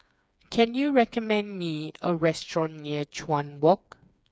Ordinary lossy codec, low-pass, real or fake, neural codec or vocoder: none; none; fake; codec, 16 kHz, 4 kbps, FreqCodec, smaller model